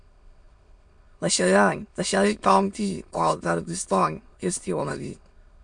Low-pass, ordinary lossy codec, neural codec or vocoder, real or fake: 9.9 kHz; MP3, 64 kbps; autoencoder, 22.05 kHz, a latent of 192 numbers a frame, VITS, trained on many speakers; fake